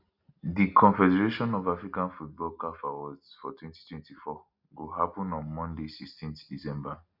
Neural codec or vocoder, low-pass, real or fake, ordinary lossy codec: none; 5.4 kHz; real; none